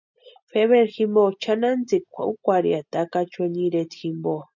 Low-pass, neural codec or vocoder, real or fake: 7.2 kHz; none; real